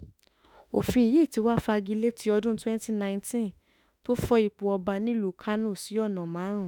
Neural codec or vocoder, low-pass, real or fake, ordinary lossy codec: autoencoder, 48 kHz, 32 numbers a frame, DAC-VAE, trained on Japanese speech; 19.8 kHz; fake; none